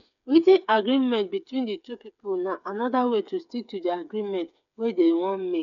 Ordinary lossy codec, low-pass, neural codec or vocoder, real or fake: none; 7.2 kHz; codec, 16 kHz, 8 kbps, FreqCodec, smaller model; fake